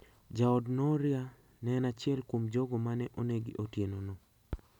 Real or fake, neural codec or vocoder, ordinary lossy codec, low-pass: real; none; none; 19.8 kHz